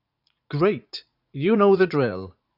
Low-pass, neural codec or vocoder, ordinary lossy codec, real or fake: 5.4 kHz; vocoder, 44.1 kHz, 80 mel bands, Vocos; none; fake